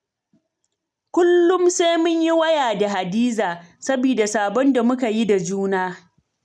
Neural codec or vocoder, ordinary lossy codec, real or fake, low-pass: none; none; real; none